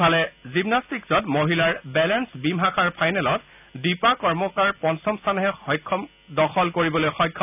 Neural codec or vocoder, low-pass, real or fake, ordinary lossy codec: none; 3.6 kHz; real; none